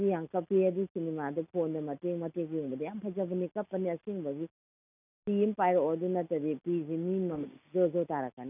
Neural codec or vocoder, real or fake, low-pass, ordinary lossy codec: none; real; 3.6 kHz; none